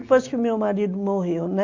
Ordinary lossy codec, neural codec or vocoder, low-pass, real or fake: AAC, 48 kbps; none; 7.2 kHz; real